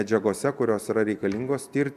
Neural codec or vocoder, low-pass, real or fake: none; 14.4 kHz; real